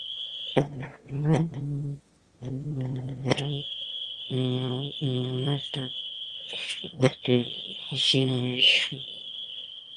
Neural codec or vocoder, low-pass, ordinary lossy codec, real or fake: autoencoder, 22.05 kHz, a latent of 192 numbers a frame, VITS, trained on one speaker; 9.9 kHz; Opus, 32 kbps; fake